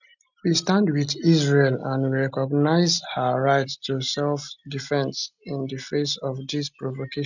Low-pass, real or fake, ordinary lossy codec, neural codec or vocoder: 7.2 kHz; real; none; none